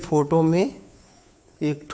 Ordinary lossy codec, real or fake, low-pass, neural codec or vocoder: none; fake; none; codec, 16 kHz, 2 kbps, FunCodec, trained on Chinese and English, 25 frames a second